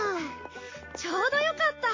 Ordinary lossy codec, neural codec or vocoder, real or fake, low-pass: MP3, 32 kbps; none; real; 7.2 kHz